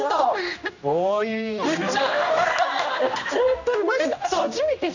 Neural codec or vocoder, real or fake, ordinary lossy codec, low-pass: codec, 16 kHz, 1 kbps, X-Codec, HuBERT features, trained on general audio; fake; none; 7.2 kHz